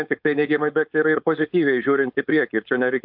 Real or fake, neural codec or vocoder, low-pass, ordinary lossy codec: fake; codec, 16 kHz, 4.8 kbps, FACodec; 5.4 kHz; AAC, 48 kbps